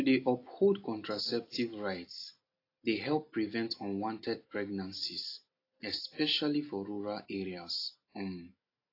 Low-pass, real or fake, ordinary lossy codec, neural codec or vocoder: 5.4 kHz; real; AAC, 32 kbps; none